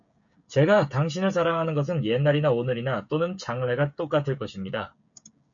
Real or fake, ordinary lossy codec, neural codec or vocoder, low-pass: fake; MP3, 64 kbps; codec, 16 kHz, 16 kbps, FreqCodec, smaller model; 7.2 kHz